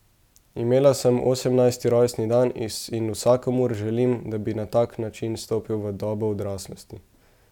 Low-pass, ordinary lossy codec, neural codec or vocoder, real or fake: 19.8 kHz; none; none; real